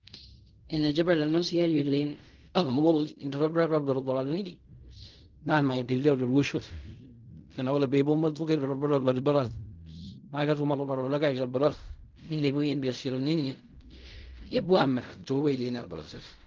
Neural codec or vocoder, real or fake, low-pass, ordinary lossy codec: codec, 16 kHz in and 24 kHz out, 0.4 kbps, LongCat-Audio-Codec, fine tuned four codebook decoder; fake; 7.2 kHz; Opus, 24 kbps